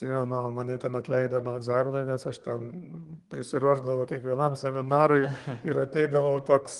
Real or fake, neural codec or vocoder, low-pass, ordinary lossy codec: fake; codec, 24 kHz, 1 kbps, SNAC; 10.8 kHz; Opus, 24 kbps